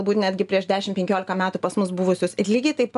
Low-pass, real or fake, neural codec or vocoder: 10.8 kHz; real; none